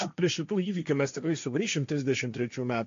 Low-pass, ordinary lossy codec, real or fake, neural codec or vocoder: 7.2 kHz; AAC, 48 kbps; fake; codec, 16 kHz, 1.1 kbps, Voila-Tokenizer